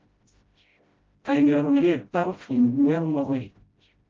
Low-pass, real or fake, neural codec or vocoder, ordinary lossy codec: 7.2 kHz; fake; codec, 16 kHz, 0.5 kbps, FreqCodec, smaller model; Opus, 24 kbps